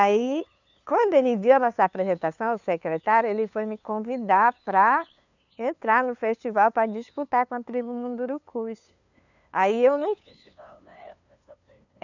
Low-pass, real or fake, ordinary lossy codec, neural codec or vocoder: 7.2 kHz; fake; none; codec, 16 kHz, 2 kbps, FunCodec, trained on LibriTTS, 25 frames a second